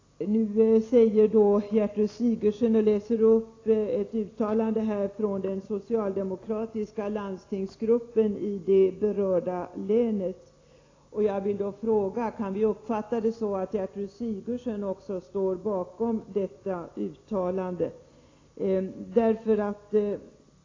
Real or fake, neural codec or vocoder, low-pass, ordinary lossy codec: real; none; 7.2 kHz; AAC, 32 kbps